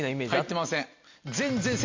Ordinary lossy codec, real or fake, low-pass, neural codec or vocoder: MP3, 48 kbps; real; 7.2 kHz; none